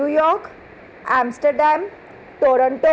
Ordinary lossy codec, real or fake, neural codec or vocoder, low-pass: none; real; none; none